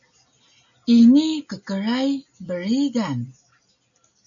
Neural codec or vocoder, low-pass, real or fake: none; 7.2 kHz; real